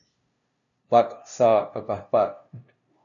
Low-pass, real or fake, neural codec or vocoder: 7.2 kHz; fake; codec, 16 kHz, 0.5 kbps, FunCodec, trained on LibriTTS, 25 frames a second